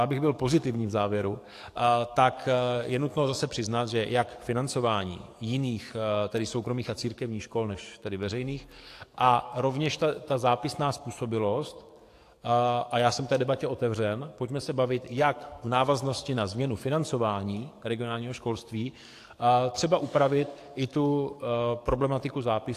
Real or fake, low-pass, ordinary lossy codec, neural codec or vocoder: fake; 14.4 kHz; AAC, 64 kbps; codec, 44.1 kHz, 7.8 kbps, DAC